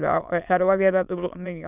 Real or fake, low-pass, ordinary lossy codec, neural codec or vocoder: fake; 3.6 kHz; none; autoencoder, 22.05 kHz, a latent of 192 numbers a frame, VITS, trained on many speakers